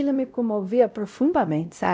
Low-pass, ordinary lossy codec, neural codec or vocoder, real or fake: none; none; codec, 16 kHz, 0.5 kbps, X-Codec, WavLM features, trained on Multilingual LibriSpeech; fake